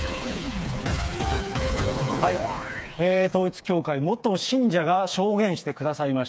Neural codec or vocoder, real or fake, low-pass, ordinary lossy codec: codec, 16 kHz, 4 kbps, FreqCodec, smaller model; fake; none; none